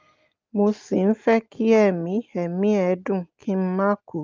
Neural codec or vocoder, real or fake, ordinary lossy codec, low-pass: none; real; Opus, 24 kbps; 7.2 kHz